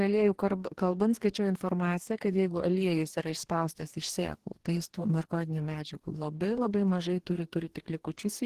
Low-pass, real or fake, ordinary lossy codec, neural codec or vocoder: 14.4 kHz; fake; Opus, 16 kbps; codec, 44.1 kHz, 2.6 kbps, DAC